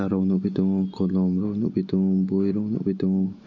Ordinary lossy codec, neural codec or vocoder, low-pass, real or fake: none; none; 7.2 kHz; real